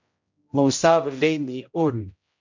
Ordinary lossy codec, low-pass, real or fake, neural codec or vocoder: MP3, 48 kbps; 7.2 kHz; fake; codec, 16 kHz, 0.5 kbps, X-Codec, HuBERT features, trained on general audio